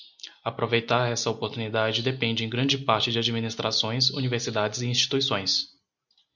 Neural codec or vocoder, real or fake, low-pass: none; real; 7.2 kHz